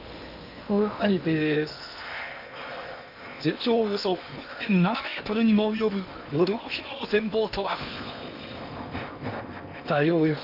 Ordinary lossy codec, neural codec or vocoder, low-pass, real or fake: none; codec, 16 kHz in and 24 kHz out, 0.8 kbps, FocalCodec, streaming, 65536 codes; 5.4 kHz; fake